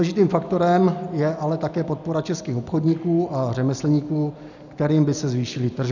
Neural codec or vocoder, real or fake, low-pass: none; real; 7.2 kHz